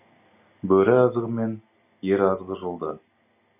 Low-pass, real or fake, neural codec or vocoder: 3.6 kHz; real; none